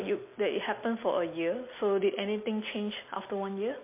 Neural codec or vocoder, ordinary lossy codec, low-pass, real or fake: none; MP3, 24 kbps; 3.6 kHz; real